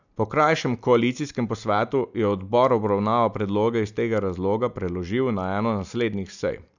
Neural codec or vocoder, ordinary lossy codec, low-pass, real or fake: none; none; 7.2 kHz; real